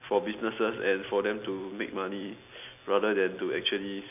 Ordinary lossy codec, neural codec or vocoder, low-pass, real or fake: none; none; 3.6 kHz; real